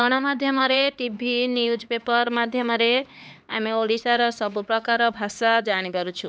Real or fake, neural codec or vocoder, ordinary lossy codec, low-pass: fake; codec, 16 kHz, 4 kbps, X-Codec, HuBERT features, trained on LibriSpeech; none; none